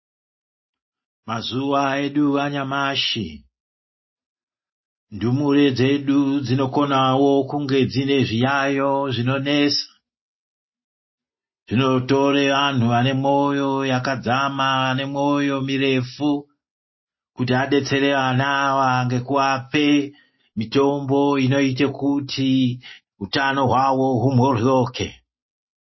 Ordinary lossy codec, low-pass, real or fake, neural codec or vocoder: MP3, 24 kbps; 7.2 kHz; real; none